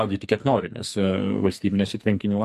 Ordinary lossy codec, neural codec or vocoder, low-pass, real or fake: MP3, 64 kbps; codec, 44.1 kHz, 2.6 kbps, SNAC; 14.4 kHz; fake